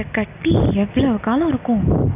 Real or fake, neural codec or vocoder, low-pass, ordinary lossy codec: real; none; 3.6 kHz; none